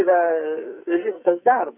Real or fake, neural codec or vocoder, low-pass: fake; codec, 44.1 kHz, 2.6 kbps, SNAC; 3.6 kHz